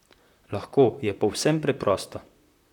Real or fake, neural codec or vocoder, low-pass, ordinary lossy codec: fake; vocoder, 44.1 kHz, 128 mel bands, Pupu-Vocoder; 19.8 kHz; none